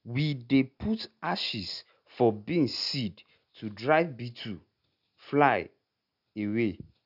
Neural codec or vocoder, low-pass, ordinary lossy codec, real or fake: none; 5.4 kHz; none; real